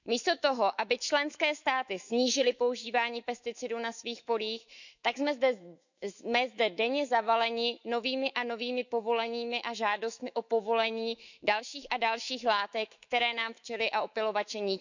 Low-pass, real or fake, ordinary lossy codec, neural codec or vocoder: 7.2 kHz; fake; none; autoencoder, 48 kHz, 128 numbers a frame, DAC-VAE, trained on Japanese speech